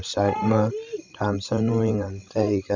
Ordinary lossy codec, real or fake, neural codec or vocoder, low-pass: Opus, 64 kbps; fake; codec, 16 kHz, 16 kbps, FreqCodec, larger model; 7.2 kHz